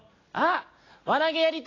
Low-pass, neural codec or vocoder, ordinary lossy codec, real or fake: 7.2 kHz; none; AAC, 32 kbps; real